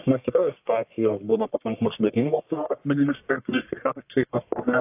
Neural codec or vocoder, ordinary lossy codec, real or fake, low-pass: codec, 44.1 kHz, 1.7 kbps, Pupu-Codec; AAC, 32 kbps; fake; 3.6 kHz